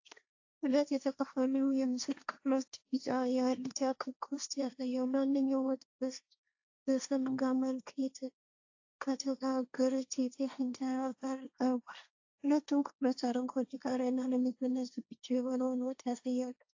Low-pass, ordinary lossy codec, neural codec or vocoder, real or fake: 7.2 kHz; AAC, 48 kbps; codec, 16 kHz, 1.1 kbps, Voila-Tokenizer; fake